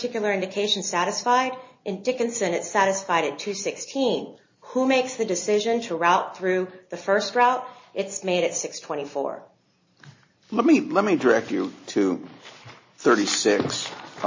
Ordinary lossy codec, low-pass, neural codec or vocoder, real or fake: MP3, 32 kbps; 7.2 kHz; none; real